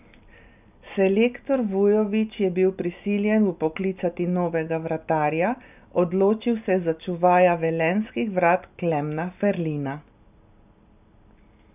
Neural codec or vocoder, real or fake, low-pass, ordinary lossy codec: none; real; 3.6 kHz; none